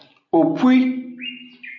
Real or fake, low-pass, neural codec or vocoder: real; 7.2 kHz; none